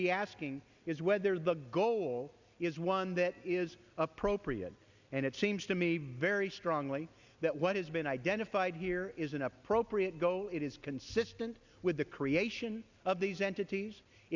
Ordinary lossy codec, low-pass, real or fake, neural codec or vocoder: Opus, 64 kbps; 7.2 kHz; real; none